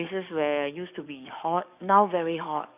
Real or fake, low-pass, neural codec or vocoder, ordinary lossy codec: fake; 3.6 kHz; codec, 44.1 kHz, 7.8 kbps, DAC; none